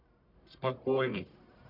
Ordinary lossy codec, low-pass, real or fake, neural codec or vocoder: none; 5.4 kHz; fake; codec, 44.1 kHz, 1.7 kbps, Pupu-Codec